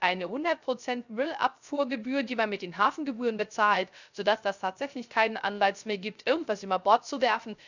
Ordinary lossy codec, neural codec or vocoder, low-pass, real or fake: none; codec, 16 kHz, 0.3 kbps, FocalCodec; 7.2 kHz; fake